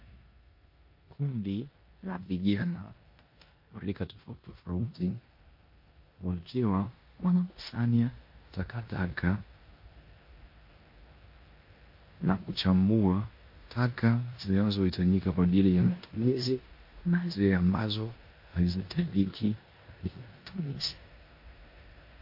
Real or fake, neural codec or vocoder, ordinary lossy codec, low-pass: fake; codec, 16 kHz in and 24 kHz out, 0.9 kbps, LongCat-Audio-Codec, four codebook decoder; MP3, 32 kbps; 5.4 kHz